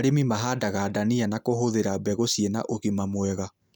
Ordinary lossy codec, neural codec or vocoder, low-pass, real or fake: none; none; none; real